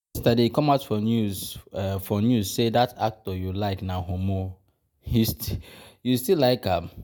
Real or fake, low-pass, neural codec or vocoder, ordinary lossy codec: real; none; none; none